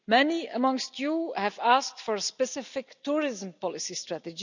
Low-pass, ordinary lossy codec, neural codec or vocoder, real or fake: 7.2 kHz; none; none; real